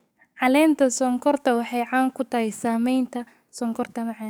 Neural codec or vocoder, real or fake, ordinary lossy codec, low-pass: codec, 44.1 kHz, 7.8 kbps, DAC; fake; none; none